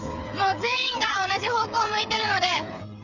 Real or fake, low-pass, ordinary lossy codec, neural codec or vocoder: fake; 7.2 kHz; none; codec, 16 kHz, 8 kbps, FreqCodec, smaller model